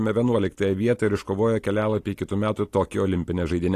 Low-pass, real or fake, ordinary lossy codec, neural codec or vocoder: 14.4 kHz; real; AAC, 48 kbps; none